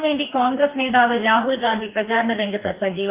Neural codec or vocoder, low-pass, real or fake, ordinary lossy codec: codec, 44.1 kHz, 2.6 kbps, DAC; 3.6 kHz; fake; Opus, 24 kbps